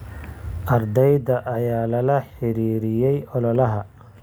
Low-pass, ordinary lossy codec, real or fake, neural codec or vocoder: none; none; real; none